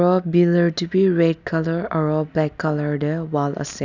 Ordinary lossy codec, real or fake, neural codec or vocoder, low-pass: none; real; none; 7.2 kHz